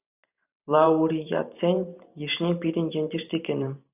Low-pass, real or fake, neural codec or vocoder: 3.6 kHz; fake; vocoder, 44.1 kHz, 128 mel bands every 512 samples, BigVGAN v2